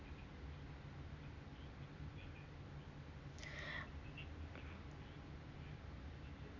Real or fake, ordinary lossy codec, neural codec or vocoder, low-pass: real; none; none; 7.2 kHz